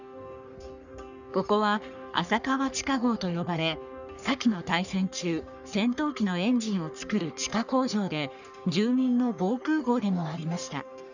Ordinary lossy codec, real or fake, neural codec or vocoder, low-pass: none; fake; codec, 44.1 kHz, 3.4 kbps, Pupu-Codec; 7.2 kHz